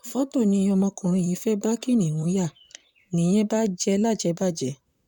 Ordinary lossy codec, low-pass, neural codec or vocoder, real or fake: none; 19.8 kHz; vocoder, 44.1 kHz, 128 mel bands, Pupu-Vocoder; fake